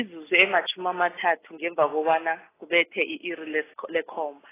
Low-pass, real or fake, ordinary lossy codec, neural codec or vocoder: 3.6 kHz; real; AAC, 16 kbps; none